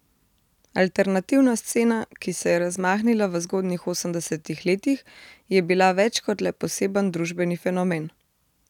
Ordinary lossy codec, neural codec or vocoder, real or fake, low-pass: none; none; real; 19.8 kHz